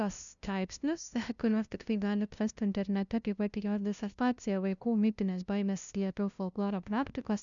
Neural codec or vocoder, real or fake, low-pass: codec, 16 kHz, 0.5 kbps, FunCodec, trained on LibriTTS, 25 frames a second; fake; 7.2 kHz